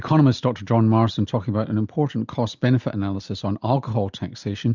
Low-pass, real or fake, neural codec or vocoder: 7.2 kHz; real; none